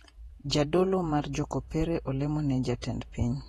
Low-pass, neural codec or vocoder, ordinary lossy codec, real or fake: 19.8 kHz; vocoder, 48 kHz, 128 mel bands, Vocos; AAC, 32 kbps; fake